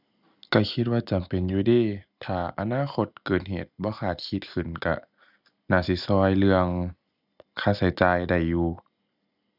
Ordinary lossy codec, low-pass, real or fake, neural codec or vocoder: none; 5.4 kHz; real; none